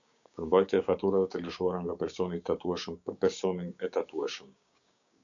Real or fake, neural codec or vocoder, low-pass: fake; codec, 16 kHz, 6 kbps, DAC; 7.2 kHz